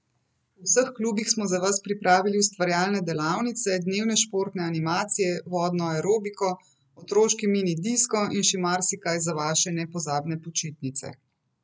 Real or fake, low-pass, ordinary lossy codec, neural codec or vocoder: real; none; none; none